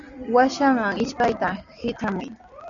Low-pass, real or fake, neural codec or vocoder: 7.2 kHz; real; none